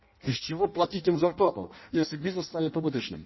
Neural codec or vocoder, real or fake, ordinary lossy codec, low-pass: codec, 16 kHz in and 24 kHz out, 0.6 kbps, FireRedTTS-2 codec; fake; MP3, 24 kbps; 7.2 kHz